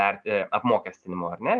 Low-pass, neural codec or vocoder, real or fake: 10.8 kHz; none; real